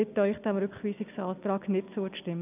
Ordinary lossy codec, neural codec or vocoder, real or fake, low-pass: none; none; real; 3.6 kHz